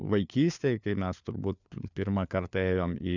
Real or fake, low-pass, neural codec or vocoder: fake; 7.2 kHz; codec, 16 kHz, 4 kbps, FunCodec, trained on LibriTTS, 50 frames a second